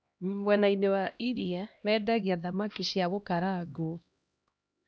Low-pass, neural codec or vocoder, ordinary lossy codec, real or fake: none; codec, 16 kHz, 1 kbps, X-Codec, HuBERT features, trained on LibriSpeech; none; fake